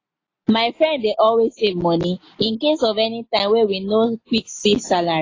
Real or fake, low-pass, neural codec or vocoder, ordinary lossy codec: real; 7.2 kHz; none; AAC, 32 kbps